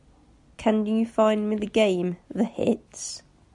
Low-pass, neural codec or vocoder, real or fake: 10.8 kHz; none; real